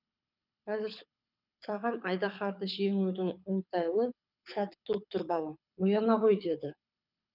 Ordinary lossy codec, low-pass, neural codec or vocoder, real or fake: none; 5.4 kHz; codec, 24 kHz, 6 kbps, HILCodec; fake